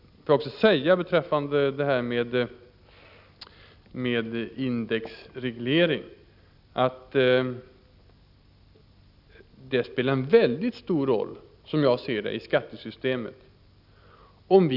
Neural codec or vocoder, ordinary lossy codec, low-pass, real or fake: none; none; 5.4 kHz; real